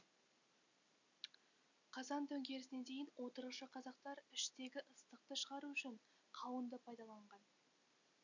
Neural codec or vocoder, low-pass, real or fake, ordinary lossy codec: none; 7.2 kHz; real; none